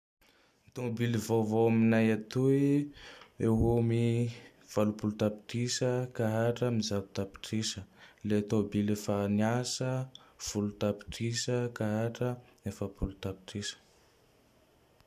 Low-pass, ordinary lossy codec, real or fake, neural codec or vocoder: 14.4 kHz; none; real; none